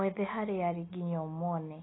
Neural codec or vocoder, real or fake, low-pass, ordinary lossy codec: none; real; 7.2 kHz; AAC, 16 kbps